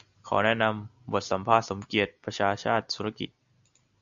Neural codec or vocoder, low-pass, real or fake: none; 7.2 kHz; real